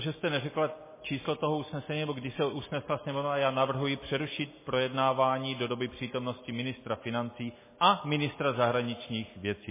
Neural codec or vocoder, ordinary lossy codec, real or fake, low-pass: none; MP3, 16 kbps; real; 3.6 kHz